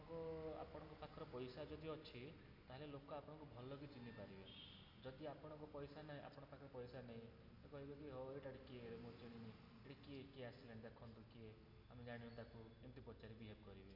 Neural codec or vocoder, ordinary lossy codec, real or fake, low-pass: none; none; real; 5.4 kHz